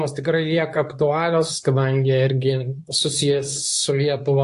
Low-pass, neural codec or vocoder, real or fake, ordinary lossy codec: 10.8 kHz; codec, 24 kHz, 0.9 kbps, WavTokenizer, medium speech release version 2; fake; AAC, 64 kbps